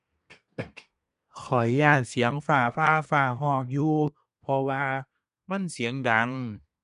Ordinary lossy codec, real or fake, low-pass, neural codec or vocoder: none; fake; 10.8 kHz; codec, 24 kHz, 1 kbps, SNAC